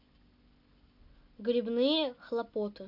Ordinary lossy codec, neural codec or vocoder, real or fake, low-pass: none; none; real; 5.4 kHz